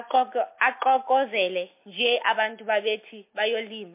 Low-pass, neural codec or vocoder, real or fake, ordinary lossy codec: 3.6 kHz; none; real; MP3, 24 kbps